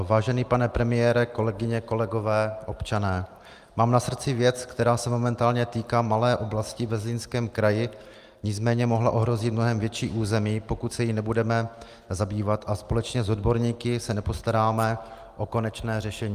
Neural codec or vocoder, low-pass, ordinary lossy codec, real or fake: none; 14.4 kHz; Opus, 32 kbps; real